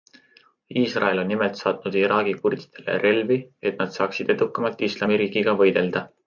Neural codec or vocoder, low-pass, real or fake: none; 7.2 kHz; real